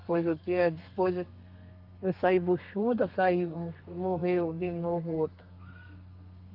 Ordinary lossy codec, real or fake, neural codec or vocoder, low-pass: Opus, 32 kbps; fake; codec, 32 kHz, 1.9 kbps, SNAC; 5.4 kHz